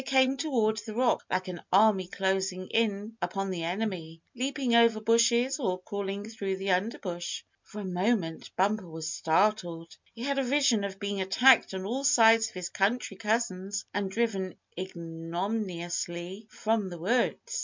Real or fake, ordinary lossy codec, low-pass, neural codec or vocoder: real; MP3, 64 kbps; 7.2 kHz; none